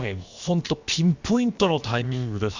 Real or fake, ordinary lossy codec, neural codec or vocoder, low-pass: fake; Opus, 64 kbps; codec, 16 kHz, about 1 kbps, DyCAST, with the encoder's durations; 7.2 kHz